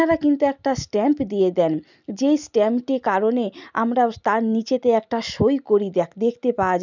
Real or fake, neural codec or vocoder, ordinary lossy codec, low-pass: real; none; none; 7.2 kHz